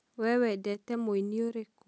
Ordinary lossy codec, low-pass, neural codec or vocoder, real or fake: none; none; none; real